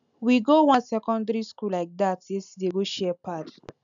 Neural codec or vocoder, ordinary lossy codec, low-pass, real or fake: none; none; 7.2 kHz; real